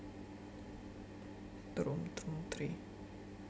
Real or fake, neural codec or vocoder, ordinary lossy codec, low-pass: real; none; none; none